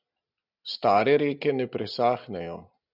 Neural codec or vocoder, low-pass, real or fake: none; 5.4 kHz; real